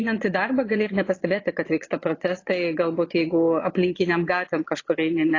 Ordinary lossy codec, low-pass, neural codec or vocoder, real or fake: AAC, 32 kbps; 7.2 kHz; vocoder, 22.05 kHz, 80 mel bands, Vocos; fake